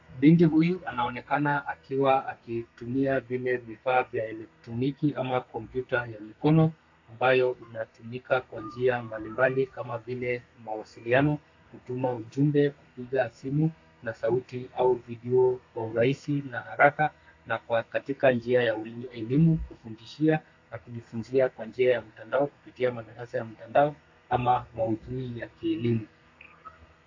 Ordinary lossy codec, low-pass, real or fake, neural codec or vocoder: AAC, 48 kbps; 7.2 kHz; fake; codec, 32 kHz, 1.9 kbps, SNAC